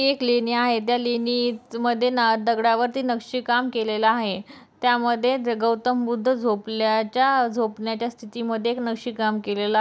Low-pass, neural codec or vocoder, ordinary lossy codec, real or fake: none; none; none; real